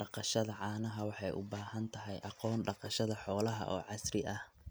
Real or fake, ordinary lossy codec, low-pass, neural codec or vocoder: real; none; none; none